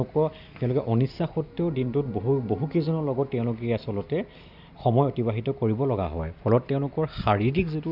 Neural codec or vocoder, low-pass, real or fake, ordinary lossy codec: none; 5.4 kHz; real; none